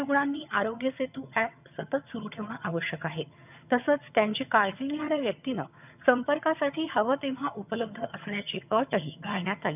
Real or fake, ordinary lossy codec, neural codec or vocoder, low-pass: fake; none; vocoder, 22.05 kHz, 80 mel bands, HiFi-GAN; 3.6 kHz